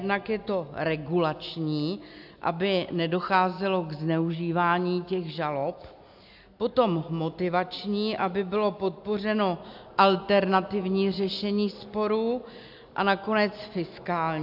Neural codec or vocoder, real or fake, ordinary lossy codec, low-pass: none; real; AAC, 48 kbps; 5.4 kHz